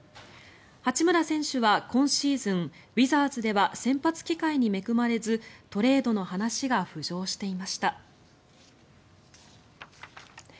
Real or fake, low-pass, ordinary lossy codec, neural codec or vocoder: real; none; none; none